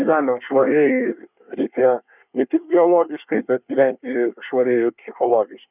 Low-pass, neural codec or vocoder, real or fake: 3.6 kHz; codec, 24 kHz, 1 kbps, SNAC; fake